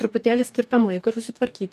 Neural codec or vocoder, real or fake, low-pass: codec, 44.1 kHz, 2.6 kbps, DAC; fake; 14.4 kHz